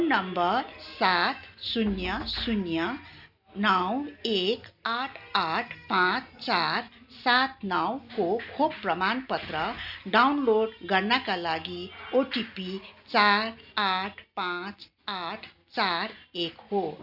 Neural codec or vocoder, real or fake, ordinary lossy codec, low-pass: none; real; none; 5.4 kHz